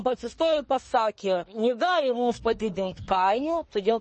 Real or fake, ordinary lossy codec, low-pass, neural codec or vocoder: fake; MP3, 32 kbps; 10.8 kHz; codec, 24 kHz, 1 kbps, SNAC